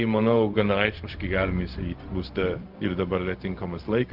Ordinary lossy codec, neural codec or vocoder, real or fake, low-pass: Opus, 32 kbps; codec, 16 kHz, 0.4 kbps, LongCat-Audio-Codec; fake; 5.4 kHz